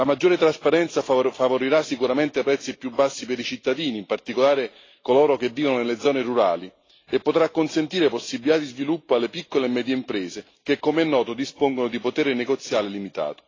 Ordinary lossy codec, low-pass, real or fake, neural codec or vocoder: AAC, 32 kbps; 7.2 kHz; real; none